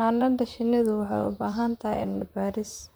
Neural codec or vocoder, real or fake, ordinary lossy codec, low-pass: vocoder, 44.1 kHz, 128 mel bands, Pupu-Vocoder; fake; none; none